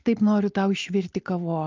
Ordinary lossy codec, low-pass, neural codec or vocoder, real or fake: Opus, 32 kbps; 7.2 kHz; none; real